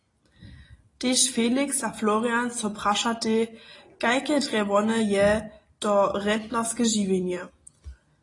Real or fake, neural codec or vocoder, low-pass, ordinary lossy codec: real; none; 10.8 kHz; AAC, 32 kbps